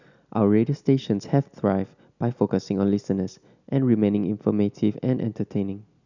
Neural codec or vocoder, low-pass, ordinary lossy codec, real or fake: none; 7.2 kHz; none; real